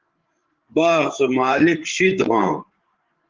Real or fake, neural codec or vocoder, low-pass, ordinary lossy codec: fake; codec, 16 kHz, 8 kbps, FreqCodec, larger model; 7.2 kHz; Opus, 16 kbps